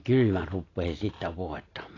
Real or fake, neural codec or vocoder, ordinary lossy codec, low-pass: real; none; AAC, 32 kbps; 7.2 kHz